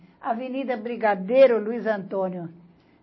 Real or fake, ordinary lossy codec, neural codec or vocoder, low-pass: real; MP3, 24 kbps; none; 7.2 kHz